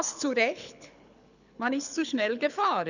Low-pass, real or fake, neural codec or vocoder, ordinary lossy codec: 7.2 kHz; fake; codec, 24 kHz, 6 kbps, HILCodec; none